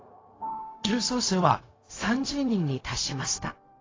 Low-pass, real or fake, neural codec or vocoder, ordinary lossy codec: 7.2 kHz; fake; codec, 16 kHz, 0.4 kbps, LongCat-Audio-Codec; AAC, 32 kbps